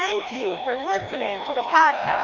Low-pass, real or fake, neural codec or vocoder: 7.2 kHz; fake; codec, 16 kHz, 1 kbps, FreqCodec, larger model